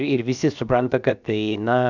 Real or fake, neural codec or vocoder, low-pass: fake; codec, 16 kHz, 0.7 kbps, FocalCodec; 7.2 kHz